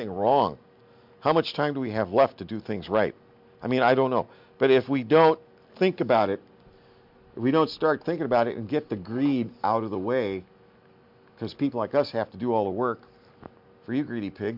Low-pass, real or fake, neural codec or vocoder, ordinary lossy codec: 5.4 kHz; real; none; MP3, 48 kbps